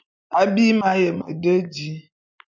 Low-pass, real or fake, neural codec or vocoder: 7.2 kHz; real; none